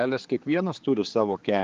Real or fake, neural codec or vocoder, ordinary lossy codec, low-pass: fake; codec, 16 kHz, 4 kbps, X-Codec, HuBERT features, trained on general audio; Opus, 32 kbps; 7.2 kHz